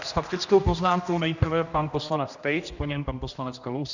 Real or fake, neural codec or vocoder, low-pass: fake; codec, 16 kHz, 1 kbps, X-Codec, HuBERT features, trained on general audio; 7.2 kHz